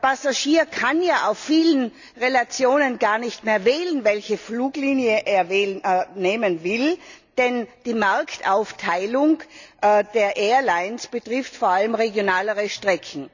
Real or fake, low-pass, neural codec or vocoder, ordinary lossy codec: real; 7.2 kHz; none; none